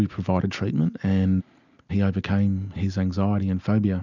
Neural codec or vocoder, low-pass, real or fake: none; 7.2 kHz; real